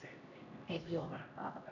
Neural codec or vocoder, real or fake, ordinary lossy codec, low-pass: codec, 16 kHz, 1 kbps, X-Codec, HuBERT features, trained on LibriSpeech; fake; none; 7.2 kHz